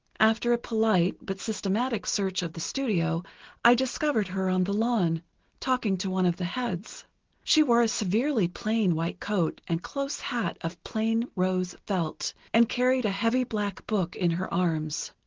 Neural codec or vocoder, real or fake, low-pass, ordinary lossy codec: none; real; 7.2 kHz; Opus, 16 kbps